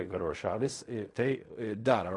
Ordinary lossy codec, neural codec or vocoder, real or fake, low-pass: MP3, 48 kbps; codec, 16 kHz in and 24 kHz out, 0.4 kbps, LongCat-Audio-Codec, fine tuned four codebook decoder; fake; 10.8 kHz